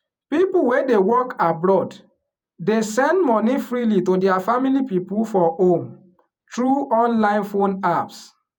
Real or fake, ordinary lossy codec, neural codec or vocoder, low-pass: fake; none; vocoder, 48 kHz, 128 mel bands, Vocos; 19.8 kHz